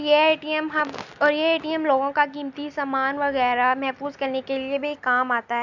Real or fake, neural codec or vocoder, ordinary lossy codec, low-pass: real; none; none; 7.2 kHz